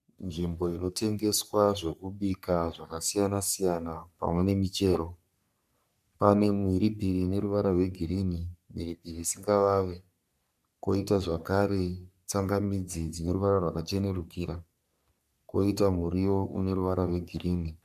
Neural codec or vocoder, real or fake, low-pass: codec, 44.1 kHz, 3.4 kbps, Pupu-Codec; fake; 14.4 kHz